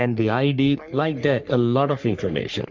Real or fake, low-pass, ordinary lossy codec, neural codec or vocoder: fake; 7.2 kHz; MP3, 64 kbps; codec, 44.1 kHz, 3.4 kbps, Pupu-Codec